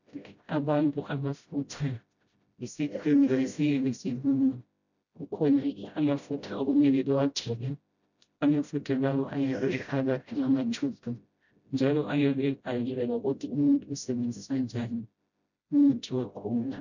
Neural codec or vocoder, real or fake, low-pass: codec, 16 kHz, 0.5 kbps, FreqCodec, smaller model; fake; 7.2 kHz